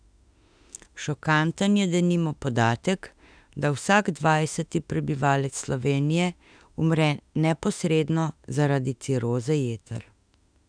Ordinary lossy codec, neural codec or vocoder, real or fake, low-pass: none; autoencoder, 48 kHz, 32 numbers a frame, DAC-VAE, trained on Japanese speech; fake; 9.9 kHz